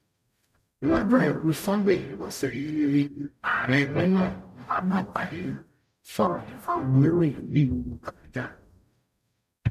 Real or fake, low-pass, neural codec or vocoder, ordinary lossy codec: fake; 14.4 kHz; codec, 44.1 kHz, 0.9 kbps, DAC; none